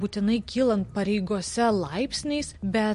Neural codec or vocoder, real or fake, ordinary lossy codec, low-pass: none; real; MP3, 48 kbps; 14.4 kHz